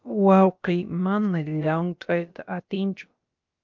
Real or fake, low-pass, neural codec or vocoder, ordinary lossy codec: fake; 7.2 kHz; codec, 16 kHz, about 1 kbps, DyCAST, with the encoder's durations; Opus, 32 kbps